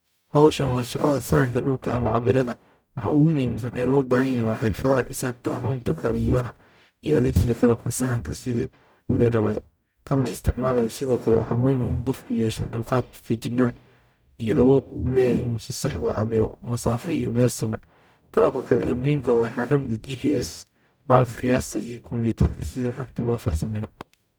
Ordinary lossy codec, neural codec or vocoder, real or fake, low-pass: none; codec, 44.1 kHz, 0.9 kbps, DAC; fake; none